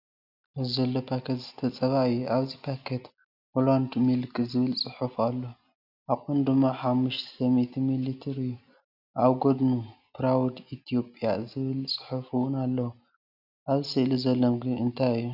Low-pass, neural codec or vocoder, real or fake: 5.4 kHz; none; real